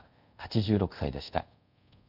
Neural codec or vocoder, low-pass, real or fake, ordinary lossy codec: codec, 24 kHz, 0.5 kbps, DualCodec; 5.4 kHz; fake; none